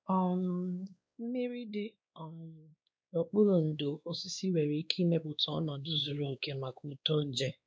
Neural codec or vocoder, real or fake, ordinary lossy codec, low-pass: codec, 16 kHz, 2 kbps, X-Codec, WavLM features, trained on Multilingual LibriSpeech; fake; none; none